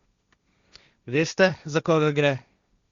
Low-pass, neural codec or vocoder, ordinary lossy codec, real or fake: 7.2 kHz; codec, 16 kHz, 1.1 kbps, Voila-Tokenizer; Opus, 64 kbps; fake